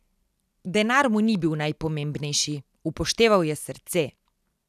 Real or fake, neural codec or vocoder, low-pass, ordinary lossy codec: real; none; 14.4 kHz; none